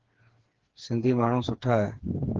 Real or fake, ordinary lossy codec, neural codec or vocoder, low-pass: fake; Opus, 16 kbps; codec, 16 kHz, 4 kbps, FreqCodec, smaller model; 7.2 kHz